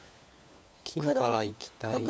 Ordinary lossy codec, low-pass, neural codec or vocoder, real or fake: none; none; codec, 16 kHz, 4 kbps, FunCodec, trained on LibriTTS, 50 frames a second; fake